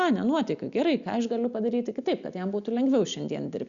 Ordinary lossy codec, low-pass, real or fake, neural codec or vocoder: Opus, 64 kbps; 7.2 kHz; real; none